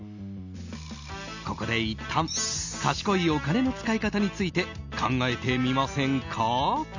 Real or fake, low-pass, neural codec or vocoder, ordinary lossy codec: real; 7.2 kHz; none; none